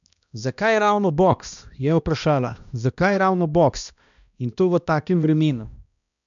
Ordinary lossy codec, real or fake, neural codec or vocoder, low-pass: none; fake; codec, 16 kHz, 1 kbps, X-Codec, HuBERT features, trained on balanced general audio; 7.2 kHz